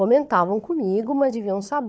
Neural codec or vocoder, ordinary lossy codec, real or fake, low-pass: codec, 16 kHz, 4 kbps, FunCodec, trained on Chinese and English, 50 frames a second; none; fake; none